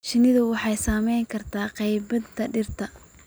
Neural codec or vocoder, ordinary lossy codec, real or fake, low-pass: none; none; real; none